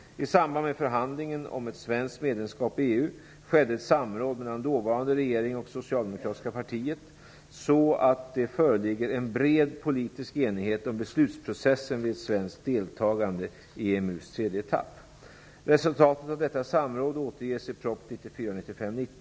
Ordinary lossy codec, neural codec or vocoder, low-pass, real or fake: none; none; none; real